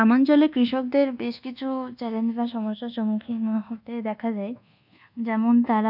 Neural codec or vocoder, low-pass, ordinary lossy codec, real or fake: codec, 24 kHz, 1.2 kbps, DualCodec; 5.4 kHz; none; fake